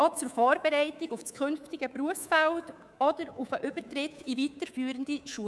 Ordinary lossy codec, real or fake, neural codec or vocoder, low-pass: none; fake; codec, 24 kHz, 3.1 kbps, DualCodec; none